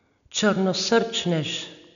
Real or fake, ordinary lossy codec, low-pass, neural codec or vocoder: real; none; 7.2 kHz; none